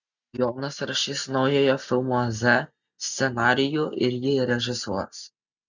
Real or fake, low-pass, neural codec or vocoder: real; 7.2 kHz; none